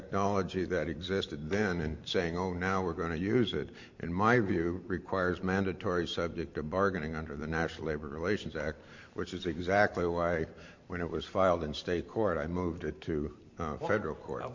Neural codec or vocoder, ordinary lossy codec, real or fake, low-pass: vocoder, 44.1 kHz, 128 mel bands every 512 samples, BigVGAN v2; MP3, 48 kbps; fake; 7.2 kHz